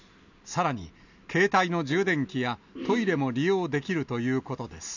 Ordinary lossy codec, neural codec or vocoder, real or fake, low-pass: none; none; real; 7.2 kHz